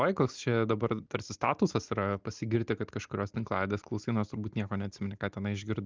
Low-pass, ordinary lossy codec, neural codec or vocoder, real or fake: 7.2 kHz; Opus, 32 kbps; none; real